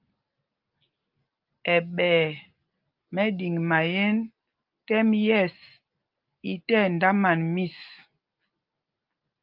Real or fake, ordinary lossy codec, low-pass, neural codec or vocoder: real; Opus, 24 kbps; 5.4 kHz; none